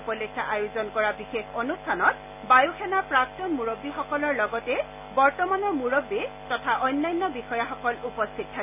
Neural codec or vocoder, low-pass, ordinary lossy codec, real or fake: none; 3.6 kHz; none; real